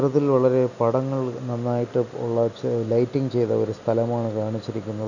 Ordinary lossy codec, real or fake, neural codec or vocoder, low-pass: none; real; none; 7.2 kHz